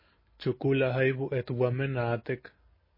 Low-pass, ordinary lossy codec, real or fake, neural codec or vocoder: 5.4 kHz; MP3, 24 kbps; real; none